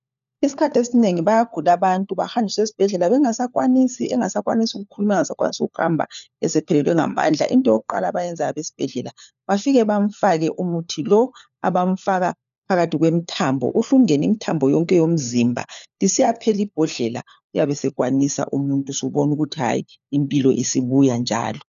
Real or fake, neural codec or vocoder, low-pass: fake; codec, 16 kHz, 4 kbps, FunCodec, trained on LibriTTS, 50 frames a second; 7.2 kHz